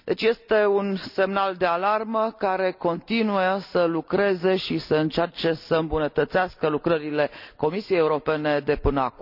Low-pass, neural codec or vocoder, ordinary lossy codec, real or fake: 5.4 kHz; none; none; real